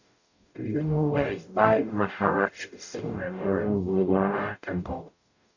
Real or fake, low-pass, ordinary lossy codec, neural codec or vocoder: fake; 7.2 kHz; none; codec, 44.1 kHz, 0.9 kbps, DAC